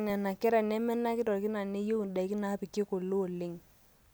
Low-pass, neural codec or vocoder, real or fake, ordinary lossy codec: none; none; real; none